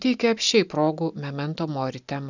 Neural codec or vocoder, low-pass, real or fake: none; 7.2 kHz; real